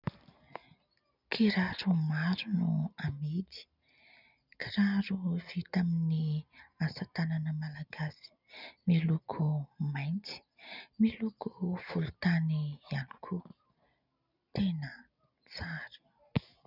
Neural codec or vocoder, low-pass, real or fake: none; 5.4 kHz; real